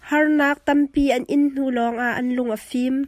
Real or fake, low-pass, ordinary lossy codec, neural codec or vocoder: real; 14.4 kHz; MP3, 96 kbps; none